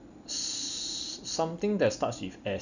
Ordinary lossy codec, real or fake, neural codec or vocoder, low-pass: none; real; none; 7.2 kHz